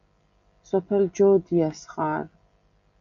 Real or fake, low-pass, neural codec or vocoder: real; 7.2 kHz; none